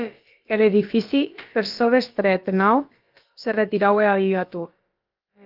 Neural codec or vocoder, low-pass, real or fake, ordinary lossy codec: codec, 16 kHz, about 1 kbps, DyCAST, with the encoder's durations; 5.4 kHz; fake; Opus, 32 kbps